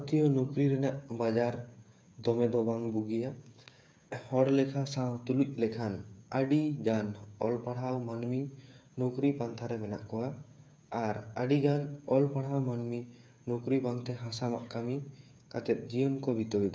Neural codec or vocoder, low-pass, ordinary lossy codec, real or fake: codec, 16 kHz, 8 kbps, FreqCodec, smaller model; none; none; fake